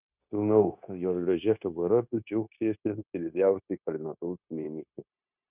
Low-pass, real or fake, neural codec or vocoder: 3.6 kHz; fake; codec, 16 kHz, 0.9 kbps, LongCat-Audio-Codec